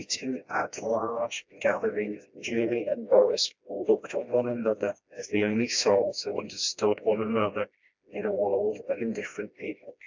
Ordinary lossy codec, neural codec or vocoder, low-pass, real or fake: MP3, 64 kbps; codec, 16 kHz, 1 kbps, FreqCodec, smaller model; 7.2 kHz; fake